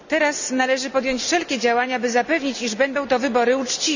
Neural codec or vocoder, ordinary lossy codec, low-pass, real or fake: none; none; 7.2 kHz; real